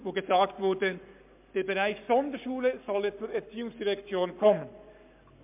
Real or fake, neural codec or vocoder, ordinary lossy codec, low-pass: fake; codec, 44.1 kHz, 7.8 kbps, Pupu-Codec; MP3, 32 kbps; 3.6 kHz